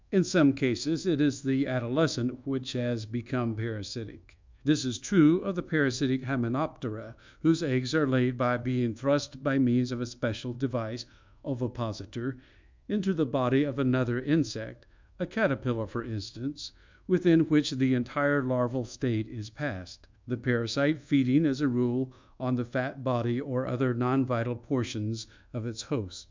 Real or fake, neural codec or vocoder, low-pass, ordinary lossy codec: fake; codec, 24 kHz, 1.2 kbps, DualCodec; 7.2 kHz; MP3, 64 kbps